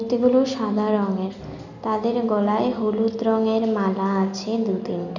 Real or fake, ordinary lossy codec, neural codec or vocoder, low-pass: real; none; none; 7.2 kHz